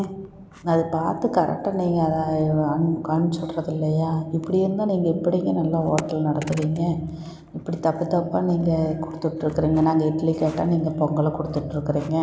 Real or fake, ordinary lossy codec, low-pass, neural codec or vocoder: real; none; none; none